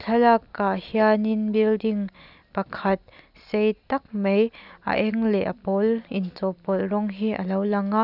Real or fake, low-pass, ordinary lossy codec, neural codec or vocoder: real; 5.4 kHz; none; none